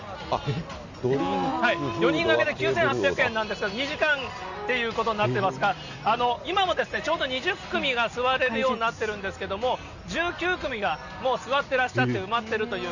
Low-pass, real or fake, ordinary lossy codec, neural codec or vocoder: 7.2 kHz; real; none; none